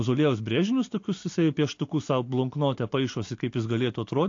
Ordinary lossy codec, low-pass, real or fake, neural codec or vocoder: AAC, 48 kbps; 7.2 kHz; real; none